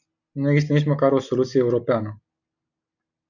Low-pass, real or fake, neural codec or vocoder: 7.2 kHz; real; none